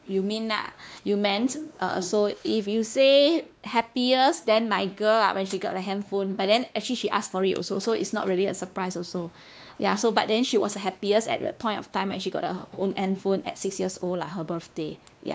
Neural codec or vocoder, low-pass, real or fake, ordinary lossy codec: codec, 16 kHz, 2 kbps, X-Codec, WavLM features, trained on Multilingual LibriSpeech; none; fake; none